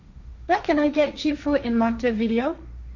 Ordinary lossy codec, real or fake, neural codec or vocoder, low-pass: none; fake; codec, 16 kHz, 1.1 kbps, Voila-Tokenizer; 7.2 kHz